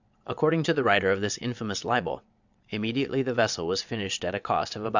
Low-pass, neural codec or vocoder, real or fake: 7.2 kHz; vocoder, 22.05 kHz, 80 mel bands, WaveNeXt; fake